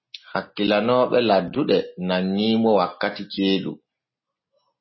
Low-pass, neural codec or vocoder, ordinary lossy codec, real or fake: 7.2 kHz; none; MP3, 24 kbps; real